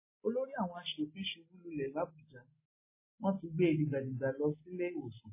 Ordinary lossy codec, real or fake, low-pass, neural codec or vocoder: MP3, 16 kbps; real; 3.6 kHz; none